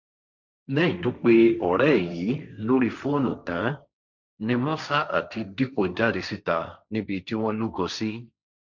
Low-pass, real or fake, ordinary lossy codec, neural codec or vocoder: 7.2 kHz; fake; none; codec, 16 kHz, 1.1 kbps, Voila-Tokenizer